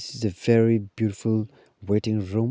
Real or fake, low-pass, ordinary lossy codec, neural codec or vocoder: real; none; none; none